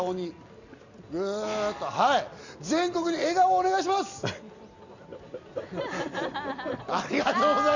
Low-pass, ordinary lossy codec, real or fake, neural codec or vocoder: 7.2 kHz; none; real; none